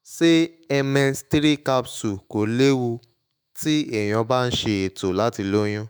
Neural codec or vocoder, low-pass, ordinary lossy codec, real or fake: autoencoder, 48 kHz, 128 numbers a frame, DAC-VAE, trained on Japanese speech; none; none; fake